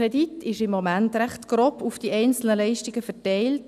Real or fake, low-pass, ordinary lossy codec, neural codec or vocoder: real; 14.4 kHz; none; none